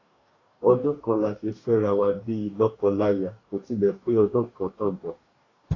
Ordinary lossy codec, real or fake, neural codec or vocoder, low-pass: AAC, 32 kbps; fake; codec, 44.1 kHz, 2.6 kbps, DAC; 7.2 kHz